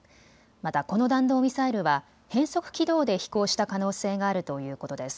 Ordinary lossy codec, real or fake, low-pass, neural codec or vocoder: none; real; none; none